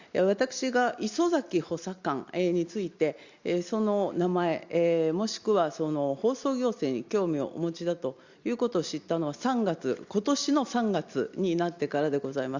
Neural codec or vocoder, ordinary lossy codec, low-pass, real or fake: none; Opus, 64 kbps; 7.2 kHz; real